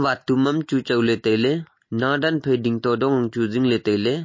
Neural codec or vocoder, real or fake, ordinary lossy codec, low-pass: none; real; MP3, 32 kbps; 7.2 kHz